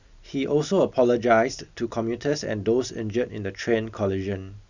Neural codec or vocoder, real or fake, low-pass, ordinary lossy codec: none; real; 7.2 kHz; none